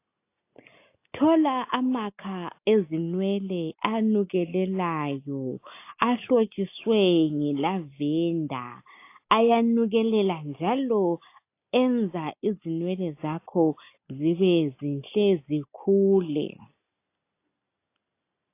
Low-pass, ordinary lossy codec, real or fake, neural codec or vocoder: 3.6 kHz; AAC, 24 kbps; real; none